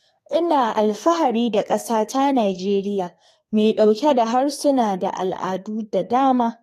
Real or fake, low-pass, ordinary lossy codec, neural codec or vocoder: fake; 14.4 kHz; AAC, 48 kbps; codec, 32 kHz, 1.9 kbps, SNAC